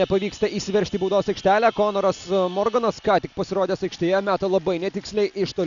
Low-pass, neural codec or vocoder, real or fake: 7.2 kHz; none; real